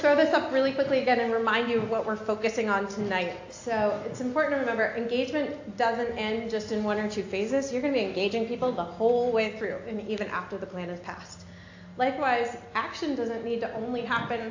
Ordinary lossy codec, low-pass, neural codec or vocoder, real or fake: AAC, 48 kbps; 7.2 kHz; none; real